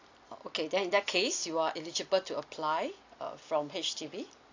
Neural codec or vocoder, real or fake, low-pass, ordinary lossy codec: none; real; 7.2 kHz; AAC, 48 kbps